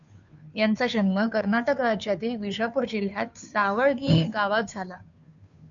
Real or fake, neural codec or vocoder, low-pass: fake; codec, 16 kHz, 2 kbps, FunCodec, trained on Chinese and English, 25 frames a second; 7.2 kHz